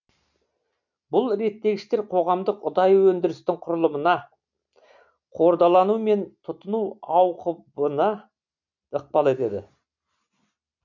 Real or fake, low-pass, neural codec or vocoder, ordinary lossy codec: real; 7.2 kHz; none; none